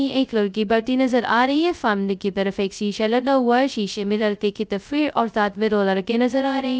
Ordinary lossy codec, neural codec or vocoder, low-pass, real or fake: none; codec, 16 kHz, 0.2 kbps, FocalCodec; none; fake